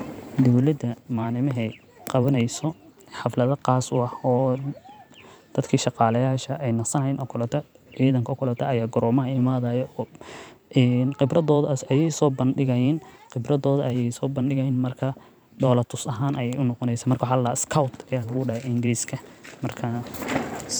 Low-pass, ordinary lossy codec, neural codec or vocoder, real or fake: none; none; vocoder, 44.1 kHz, 128 mel bands every 512 samples, BigVGAN v2; fake